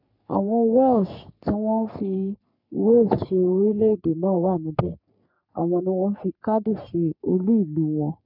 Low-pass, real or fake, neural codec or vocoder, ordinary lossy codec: 5.4 kHz; fake; codec, 44.1 kHz, 3.4 kbps, Pupu-Codec; none